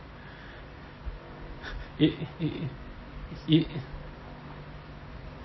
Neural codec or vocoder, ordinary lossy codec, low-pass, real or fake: none; MP3, 24 kbps; 7.2 kHz; real